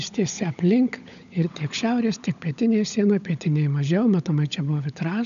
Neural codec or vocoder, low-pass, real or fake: codec, 16 kHz, 16 kbps, FunCodec, trained on LibriTTS, 50 frames a second; 7.2 kHz; fake